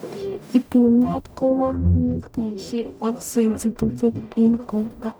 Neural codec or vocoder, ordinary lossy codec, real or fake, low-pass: codec, 44.1 kHz, 0.9 kbps, DAC; none; fake; none